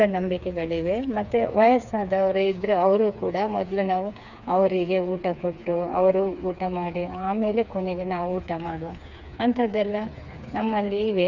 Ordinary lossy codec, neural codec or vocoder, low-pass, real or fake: none; codec, 16 kHz, 4 kbps, FreqCodec, smaller model; 7.2 kHz; fake